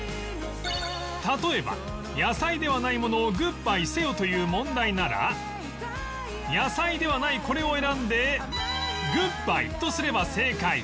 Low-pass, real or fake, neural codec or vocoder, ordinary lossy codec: none; real; none; none